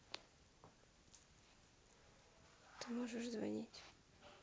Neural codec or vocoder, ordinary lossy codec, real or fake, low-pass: none; none; real; none